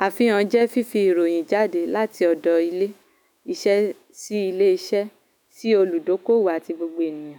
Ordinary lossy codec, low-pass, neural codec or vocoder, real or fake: none; 19.8 kHz; autoencoder, 48 kHz, 128 numbers a frame, DAC-VAE, trained on Japanese speech; fake